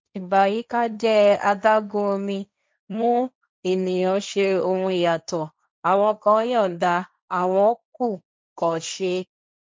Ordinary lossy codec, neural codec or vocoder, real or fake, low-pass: none; codec, 16 kHz, 1.1 kbps, Voila-Tokenizer; fake; none